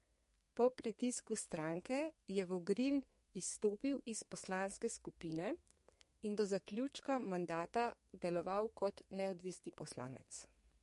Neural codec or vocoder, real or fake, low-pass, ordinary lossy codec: codec, 32 kHz, 1.9 kbps, SNAC; fake; 14.4 kHz; MP3, 48 kbps